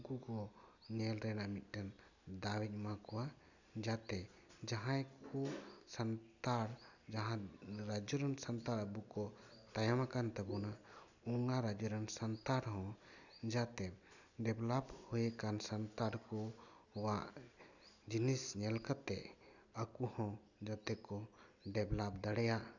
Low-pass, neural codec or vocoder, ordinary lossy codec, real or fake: 7.2 kHz; none; none; real